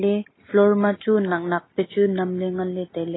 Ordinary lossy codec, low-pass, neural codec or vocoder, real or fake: AAC, 16 kbps; 7.2 kHz; none; real